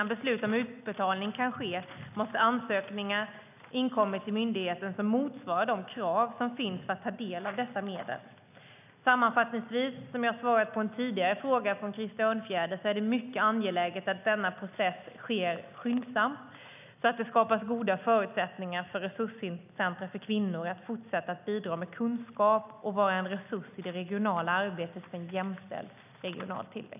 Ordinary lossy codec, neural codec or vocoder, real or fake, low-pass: none; none; real; 3.6 kHz